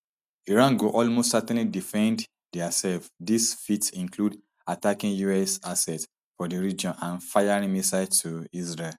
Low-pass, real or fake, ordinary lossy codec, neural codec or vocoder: 14.4 kHz; real; none; none